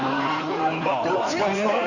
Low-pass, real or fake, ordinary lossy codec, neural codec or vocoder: 7.2 kHz; fake; none; codec, 16 kHz in and 24 kHz out, 2.2 kbps, FireRedTTS-2 codec